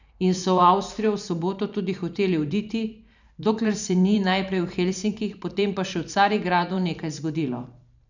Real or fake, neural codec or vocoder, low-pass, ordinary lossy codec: fake; vocoder, 44.1 kHz, 128 mel bands every 512 samples, BigVGAN v2; 7.2 kHz; none